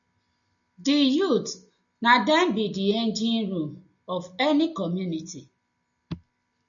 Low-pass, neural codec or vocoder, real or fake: 7.2 kHz; none; real